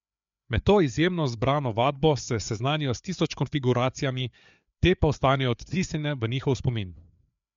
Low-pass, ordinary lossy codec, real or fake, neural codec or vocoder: 7.2 kHz; MP3, 64 kbps; fake; codec, 16 kHz, 4 kbps, FreqCodec, larger model